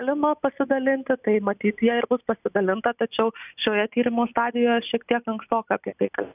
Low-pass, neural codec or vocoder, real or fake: 3.6 kHz; none; real